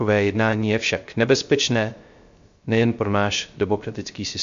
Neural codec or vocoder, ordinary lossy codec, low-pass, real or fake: codec, 16 kHz, 0.3 kbps, FocalCodec; MP3, 48 kbps; 7.2 kHz; fake